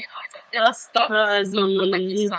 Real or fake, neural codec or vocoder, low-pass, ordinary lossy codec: fake; codec, 16 kHz, 8 kbps, FunCodec, trained on LibriTTS, 25 frames a second; none; none